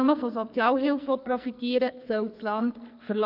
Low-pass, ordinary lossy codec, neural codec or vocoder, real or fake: 5.4 kHz; none; codec, 44.1 kHz, 2.6 kbps, SNAC; fake